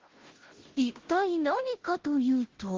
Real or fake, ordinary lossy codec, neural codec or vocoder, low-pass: fake; Opus, 16 kbps; codec, 16 kHz, 0.5 kbps, FunCodec, trained on Chinese and English, 25 frames a second; 7.2 kHz